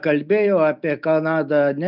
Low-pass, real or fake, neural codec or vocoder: 5.4 kHz; real; none